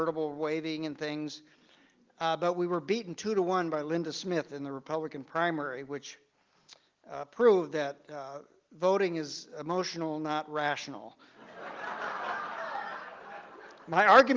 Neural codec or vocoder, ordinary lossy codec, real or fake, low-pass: none; Opus, 32 kbps; real; 7.2 kHz